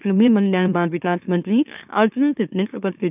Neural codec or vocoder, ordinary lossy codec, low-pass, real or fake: autoencoder, 44.1 kHz, a latent of 192 numbers a frame, MeloTTS; none; 3.6 kHz; fake